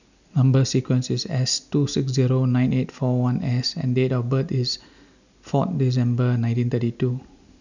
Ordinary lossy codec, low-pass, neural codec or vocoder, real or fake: none; 7.2 kHz; none; real